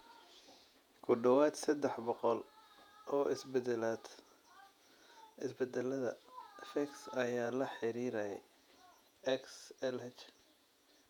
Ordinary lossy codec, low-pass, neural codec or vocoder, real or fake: none; 19.8 kHz; vocoder, 48 kHz, 128 mel bands, Vocos; fake